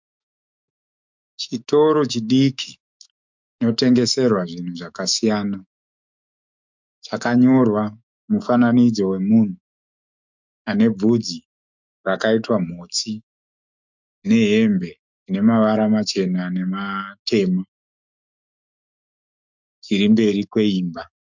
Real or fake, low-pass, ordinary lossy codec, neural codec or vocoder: fake; 7.2 kHz; MP3, 64 kbps; autoencoder, 48 kHz, 128 numbers a frame, DAC-VAE, trained on Japanese speech